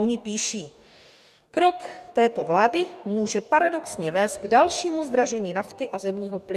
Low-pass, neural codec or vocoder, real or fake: 14.4 kHz; codec, 44.1 kHz, 2.6 kbps, DAC; fake